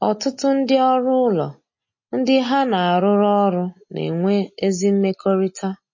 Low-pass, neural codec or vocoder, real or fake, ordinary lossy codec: 7.2 kHz; none; real; MP3, 32 kbps